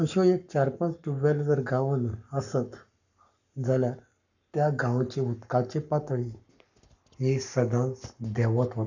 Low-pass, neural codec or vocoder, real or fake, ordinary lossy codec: 7.2 kHz; codec, 44.1 kHz, 7.8 kbps, Pupu-Codec; fake; none